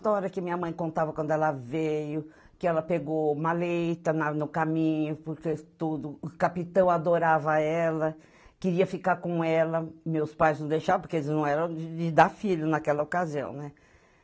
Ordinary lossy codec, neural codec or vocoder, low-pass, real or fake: none; none; none; real